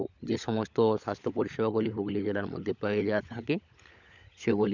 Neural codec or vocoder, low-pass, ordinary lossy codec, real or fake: codec, 16 kHz, 16 kbps, FunCodec, trained on LibriTTS, 50 frames a second; 7.2 kHz; none; fake